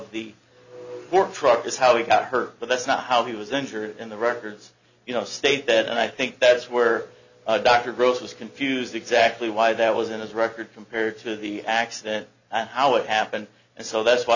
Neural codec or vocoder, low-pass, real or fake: none; 7.2 kHz; real